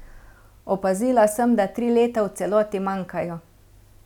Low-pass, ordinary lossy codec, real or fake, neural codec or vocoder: 19.8 kHz; none; real; none